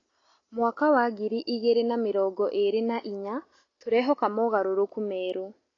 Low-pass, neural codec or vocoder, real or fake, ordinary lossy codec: 7.2 kHz; none; real; AAC, 32 kbps